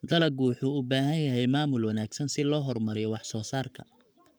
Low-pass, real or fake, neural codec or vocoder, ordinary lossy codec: none; fake; codec, 44.1 kHz, 7.8 kbps, Pupu-Codec; none